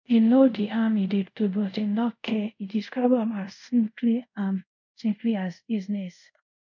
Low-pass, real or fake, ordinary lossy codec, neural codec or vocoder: 7.2 kHz; fake; none; codec, 24 kHz, 0.5 kbps, DualCodec